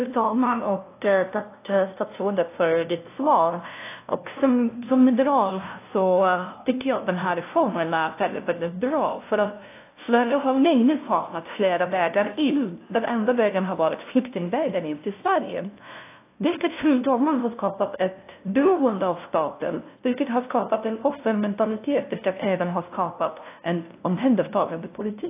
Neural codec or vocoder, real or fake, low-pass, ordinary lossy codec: codec, 16 kHz, 0.5 kbps, FunCodec, trained on LibriTTS, 25 frames a second; fake; 3.6 kHz; AAC, 24 kbps